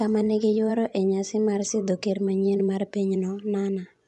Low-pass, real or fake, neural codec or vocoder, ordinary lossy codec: 10.8 kHz; fake; vocoder, 24 kHz, 100 mel bands, Vocos; none